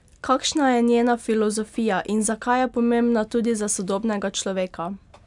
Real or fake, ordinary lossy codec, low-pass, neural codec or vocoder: real; none; 10.8 kHz; none